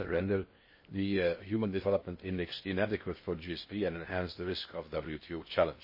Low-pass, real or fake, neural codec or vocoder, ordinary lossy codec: 5.4 kHz; fake; codec, 16 kHz in and 24 kHz out, 0.8 kbps, FocalCodec, streaming, 65536 codes; MP3, 24 kbps